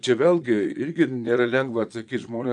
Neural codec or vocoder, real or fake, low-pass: vocoder, 22.05 kHz, 80 mel bands, Vocos; fake; 9.9 kHz